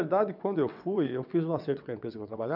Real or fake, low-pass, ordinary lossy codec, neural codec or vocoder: fake; 5.4 kHz; none; vocoder, 22.05 kHz, 80 mel bands, WaveNeXt